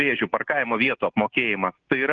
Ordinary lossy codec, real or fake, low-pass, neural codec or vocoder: Opus, 16 kbps; real; 7.2 kHz; none